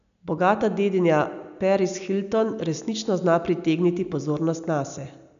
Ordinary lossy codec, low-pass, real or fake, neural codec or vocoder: MP3, 96 kbps; 7.2 kHz; real; none